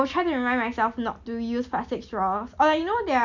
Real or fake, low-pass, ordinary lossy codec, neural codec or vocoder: real; 7.2 kHz; none; none